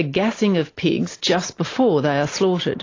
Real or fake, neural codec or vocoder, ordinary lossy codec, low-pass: real; none; AAC, 32 kbps; 7.2 kHz